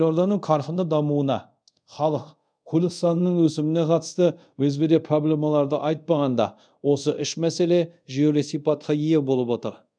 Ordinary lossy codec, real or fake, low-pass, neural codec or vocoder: none; fake; 9.9 kHz; codec, 24 kHz, 0.5 kbps, DualCodec